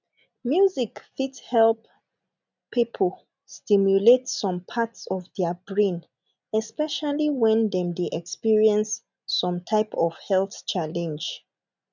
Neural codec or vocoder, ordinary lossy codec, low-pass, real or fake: none; none; 7.2 kHz; real